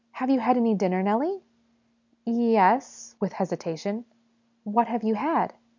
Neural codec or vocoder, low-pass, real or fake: none; 7.2 kHz; real